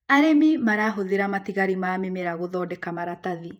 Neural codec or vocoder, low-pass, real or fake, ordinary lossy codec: vocoder, 48 kHz, 128 mel bands, Vocos; 19.8 kHz; fake; none